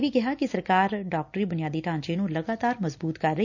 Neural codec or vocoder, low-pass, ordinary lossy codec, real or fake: none; 7.2 kHz; none; real